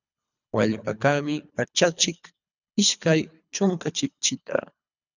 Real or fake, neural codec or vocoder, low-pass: fake; codec, 24 kHz, 3 kbps, HILCodec; 7.2 kHz